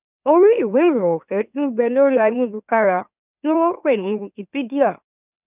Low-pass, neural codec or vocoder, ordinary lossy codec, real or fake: 3.6 kHz; autoencoder, 44.1 kHz, a latent of 192 numbers a frame, MeloTTS; none; fake